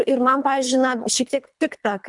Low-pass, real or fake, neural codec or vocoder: 10.8 kHz; fake; codec, 24 kHz, 3 kbps, HILCodec